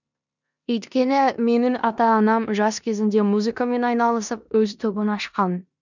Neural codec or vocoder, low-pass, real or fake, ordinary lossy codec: codec, 16 kHz in and 24 kHz out, 0.9 kbps, LongCat-Audio-Codec, four codebook decoder; 7.2 kHz; fake; none